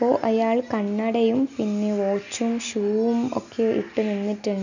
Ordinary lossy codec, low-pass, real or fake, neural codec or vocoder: none; 7.2 kHz; real; none